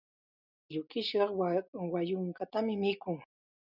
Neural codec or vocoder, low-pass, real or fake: none; 5.4 kHz; real